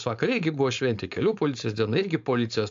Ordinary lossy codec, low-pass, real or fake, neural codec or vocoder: AAC, 64 kbps; 7.2 kHz; fake; codec, 16 kHz, 4.8 kbps, FACodec